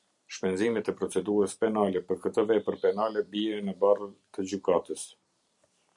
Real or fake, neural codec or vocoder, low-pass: fake; vocoder, 44.1 kHz, 128 mel bands every 256 samples, BigVGAN v2; 10.8 kHz